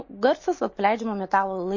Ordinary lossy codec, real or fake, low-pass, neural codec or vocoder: MP3, 32 kbps; real; 7.2 kHz; none